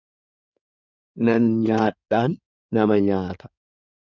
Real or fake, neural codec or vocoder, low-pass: fake; codec, 16 kHz in and 24 kHz out, 2.2 kbps, FireRedTTS-2 codec; 7.2 kHz